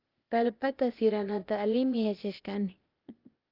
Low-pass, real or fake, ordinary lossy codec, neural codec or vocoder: 5.4 kHz; fake; Opus, 32 kbps; codec, 16 kHz, 0.8 kbps, ZipCodec